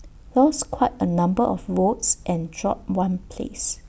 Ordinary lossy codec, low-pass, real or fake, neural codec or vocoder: none; none; real; none